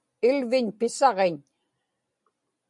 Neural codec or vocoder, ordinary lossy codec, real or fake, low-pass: none; MP3, 64 kbps; real; 10.8 kHz